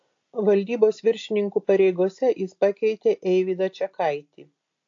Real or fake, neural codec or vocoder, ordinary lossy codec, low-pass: real; none; AAC, 48 kbps; 7.2 kHz